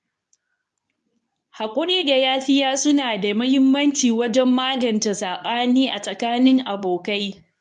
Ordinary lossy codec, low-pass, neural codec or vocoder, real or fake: none; 10.8 kHz; codec, 24 kHz, 0.9 kbps, WavTokenizer, medium speech release version 2; fake